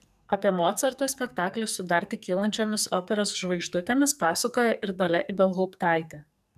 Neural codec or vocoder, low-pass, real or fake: codec, 44.1 kHz, 2.6 kbps, SNAC; 14.4 kHz; fake